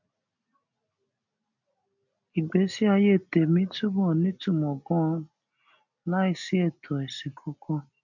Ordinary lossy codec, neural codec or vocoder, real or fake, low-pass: none; none; real; 7.2 kHz